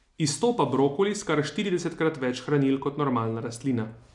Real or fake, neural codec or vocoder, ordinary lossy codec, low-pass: real; none; none; 10.8 kHz